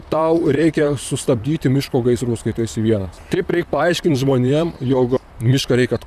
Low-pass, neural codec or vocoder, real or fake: 14.4 kHz; vocoder, 44.1 kHz, 128 mel bands, Pupu-Vocoder; fake